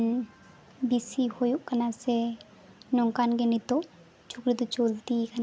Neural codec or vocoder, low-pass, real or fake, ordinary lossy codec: none; none; real; none